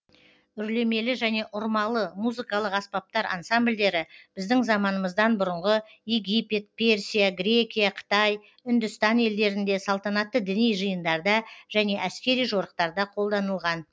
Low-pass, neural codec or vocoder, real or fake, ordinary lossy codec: none; none; real; none